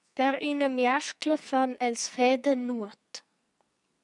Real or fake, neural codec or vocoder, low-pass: fake; codec, 44.1 kHz, 2.6 kbps, SNAC; 10.8 kHz